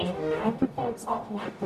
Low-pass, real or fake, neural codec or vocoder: 14.4 kHz; fake; codec, 44.1 kHz, 0.9 kbps, DAC